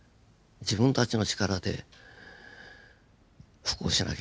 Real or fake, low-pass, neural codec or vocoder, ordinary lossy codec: real; none; none; none